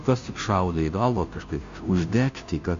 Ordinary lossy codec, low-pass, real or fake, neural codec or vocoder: MP3, 64 kbps; 7.2 kHz; fake; codec, 16 kHz, 0.5 kbps, FunCodec, trained on Chinese and English, 25 frames a second